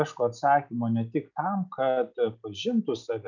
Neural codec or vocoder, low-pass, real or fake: none; 7.2 kHz; real